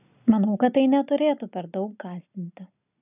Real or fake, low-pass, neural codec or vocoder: real; 3.6 kHz; none